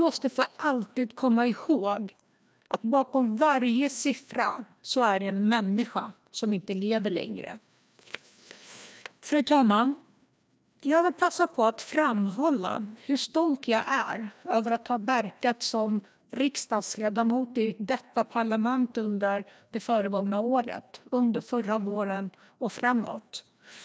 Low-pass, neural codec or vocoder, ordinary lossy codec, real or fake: none; codec, 16 kHz, 1 kbps, FreqCodec, larger model; none; fake